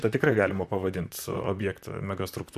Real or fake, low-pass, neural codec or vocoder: fake; 14.4 kHz; vocoder, 44.1 kHz, 128 mel bands, Pupu-Vocoder